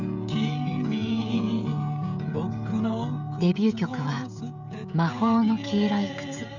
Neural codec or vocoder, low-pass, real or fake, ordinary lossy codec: codec, 16 kHz, 16 kbps, FreqCodec, smaller model; 7.2 kHz; fake; none